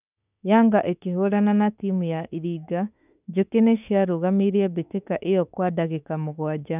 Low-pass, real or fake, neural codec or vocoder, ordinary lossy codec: 3.6 kHz; fake; autoencoder, 48 kHz, 32 numbers a frame, DAC-VAE, trained on Japanese speech; none